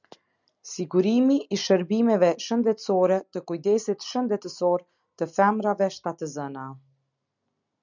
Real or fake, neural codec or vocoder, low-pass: real; none; 7.2 kHz